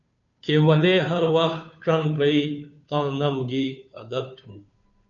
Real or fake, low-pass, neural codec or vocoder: fake; 7.2 kHz; codec, 16 kHz, 2 kbps, FunCodec, trained on Chinese and English, 25 frames a second